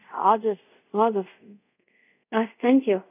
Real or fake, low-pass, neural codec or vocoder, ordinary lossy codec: fake; 3.6 kHz; codec, 24 kHz, 0.5 kbps, DualCodec; none